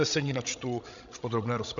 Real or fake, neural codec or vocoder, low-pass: fake; codec, 16 kHz, 16 kbps, FreqCodec, larger model; 7.2 kHz